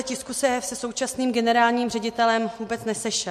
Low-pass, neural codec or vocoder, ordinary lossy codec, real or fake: 14.4 kHz; none; MP3, 64 kbps; real